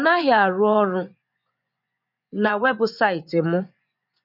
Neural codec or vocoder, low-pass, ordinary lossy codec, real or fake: vocoder, 24 kHz, 100 mel bands, Vocos; 5.4 kHz; none; fake